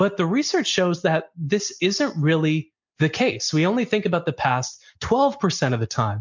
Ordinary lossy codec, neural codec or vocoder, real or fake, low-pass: MP3, 64 kbps; none; real; 7.2 kHz